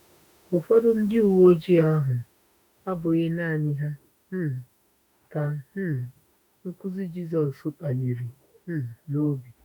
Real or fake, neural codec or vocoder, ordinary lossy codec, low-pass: fake; autoencoder, 48 kHz, 32 numbers a frame, DAC-VAE, trained on Japanese speech; none; none